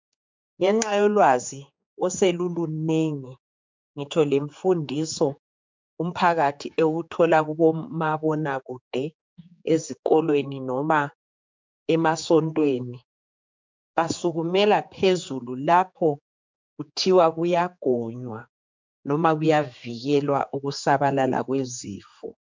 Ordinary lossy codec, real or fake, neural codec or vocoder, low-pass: MP3, 64 kbps; fake; codec, 16 kHz, 4 kbps, X-Codec, HuBERT features, trained on general audio; 7.2 kHz